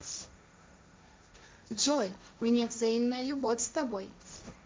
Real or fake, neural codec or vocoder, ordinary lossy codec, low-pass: fake; codec, 16 kHz, 1.1 kbps, Voila-Tokenizer; none; none